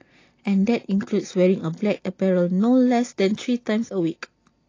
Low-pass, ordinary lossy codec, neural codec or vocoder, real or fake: 7.2 kHz; AAC, 32 kbps; none; real